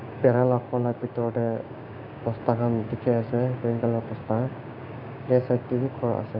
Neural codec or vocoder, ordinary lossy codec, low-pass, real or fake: codec, 16 kHz, 6 kbps, DAC; none; 5.4 kHz; fake